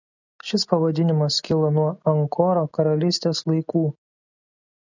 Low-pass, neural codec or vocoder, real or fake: 7.2 kHz; none; real